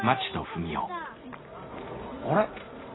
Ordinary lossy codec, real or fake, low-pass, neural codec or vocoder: AAC, 16 kbps; real; 7.2 kHz; none